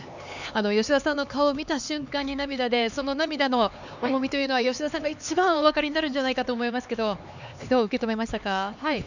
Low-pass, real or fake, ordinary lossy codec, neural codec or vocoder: 7.2 kHz; fake; none; codec, 16 kHz, 4 kbps, X-Codec, HuBERT features, trained on LibriSpeech